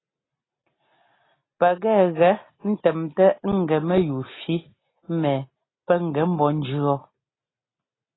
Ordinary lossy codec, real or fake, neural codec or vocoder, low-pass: AAC, 16 kbps; real; none; 7.2 kHz